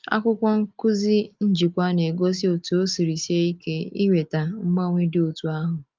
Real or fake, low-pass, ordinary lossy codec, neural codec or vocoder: real; 7.2 kHz; Opus, 24 kbps; none